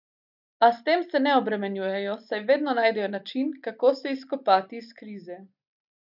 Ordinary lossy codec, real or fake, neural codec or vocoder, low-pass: none; real; none; 5.4 kHz